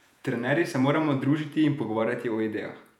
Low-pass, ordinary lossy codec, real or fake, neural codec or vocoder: 19.8 kHz; none; fake; vocoder, 48 kHz, 128 mel bands, Vocos